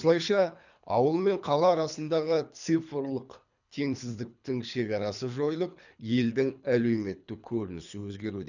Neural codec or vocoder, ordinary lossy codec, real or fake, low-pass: codec, 24 kHz, 3 kbps, HILCodec; none; fake; 7.2 kHz